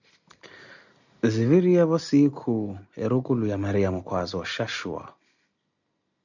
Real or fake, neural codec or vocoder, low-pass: real; none; 7.2 kHz